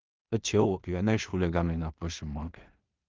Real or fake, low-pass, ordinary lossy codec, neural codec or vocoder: fake; 7.2 kHz; Opus, 32 kbps; codec, 16 kHz in and 24 kHz out, 0.4 kbps, LongCat-Audio-Codec, two codebook decoder